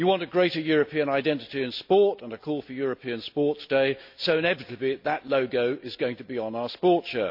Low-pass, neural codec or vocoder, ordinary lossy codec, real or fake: 5.4 kHz; none; MP3, 48 kbps; real